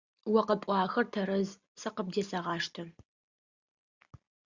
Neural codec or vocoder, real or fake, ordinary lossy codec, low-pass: none; real; Opus, 64 kbps; 7.2 kHz